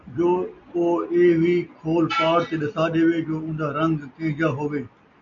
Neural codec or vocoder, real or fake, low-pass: none; real; 7.2 kHz